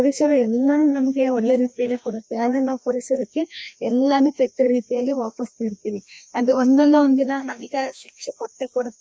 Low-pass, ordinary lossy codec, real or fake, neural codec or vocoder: none; none; fake; codec, 16 kHz, 1 kbps, FreqCodec, larger model